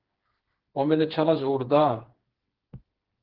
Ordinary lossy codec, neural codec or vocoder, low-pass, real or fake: Opus, 16 kbps; codec, 16 kHz, 4 kbps, FreqCodec, smaller model; 5.4 kHz; fake